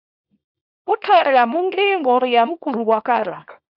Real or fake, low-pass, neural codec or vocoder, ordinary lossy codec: fake; 5.4 kHz; codec, 24 kHz, 0.9 kbps, WavTokenizer, small release; AAC, 48 kbps